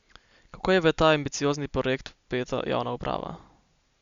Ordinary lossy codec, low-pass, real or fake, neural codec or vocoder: Opus, 64 kbps; 7.2 kHz; real; none